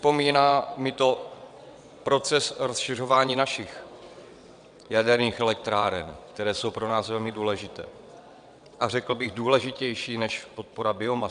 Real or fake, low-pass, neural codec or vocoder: fake; 9.9 kHz; vocoder, 22.05 kHz, 80 mel bands, WaveNeXt